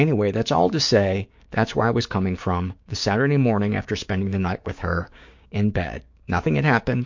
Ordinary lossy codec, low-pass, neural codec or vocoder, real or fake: MP3, 48 kbps; 7.2 kHz; codec, 16 kHz, 6 kbps, DAC; fake